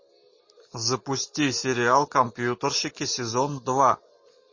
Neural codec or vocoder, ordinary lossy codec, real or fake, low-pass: none; MP3, 32 kbps; real; 7.2 kHz